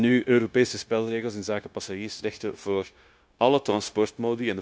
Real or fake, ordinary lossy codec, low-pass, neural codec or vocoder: fake; none; none; codec, 16 kHz, 0.9 kbps, LongCat-Audio-Codec